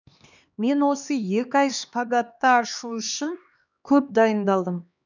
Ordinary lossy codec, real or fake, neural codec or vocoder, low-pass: none; fake; codec, 16 kHz, 2 kbps, X-Codec, HuBERT features, trained on LibriSpeech; 7.2 kHz